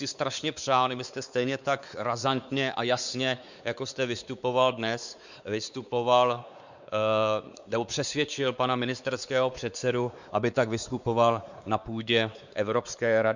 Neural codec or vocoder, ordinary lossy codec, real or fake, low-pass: codec, 16 kHz, 4 kbps, X-Codec, WavLM features, trained on Multilingual LibriSpeech; Opus, 64 kbps; fake; 7.2 kHz